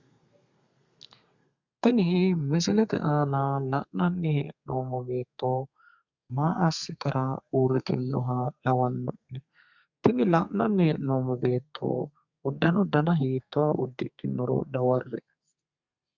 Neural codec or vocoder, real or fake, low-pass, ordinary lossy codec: codec, 32 kHz, 1.9 kbps, SNAC; fake; 7.2 kHz; Opus, 64 kbps